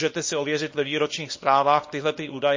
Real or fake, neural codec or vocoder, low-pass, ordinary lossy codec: fake; codec, 24 kHz, 0.9 kbps, WavTokenizer, small release; 7.2 kHz; MP3, 32 kbps